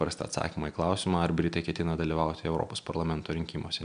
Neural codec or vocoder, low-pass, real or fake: none; 9.9 kHz; real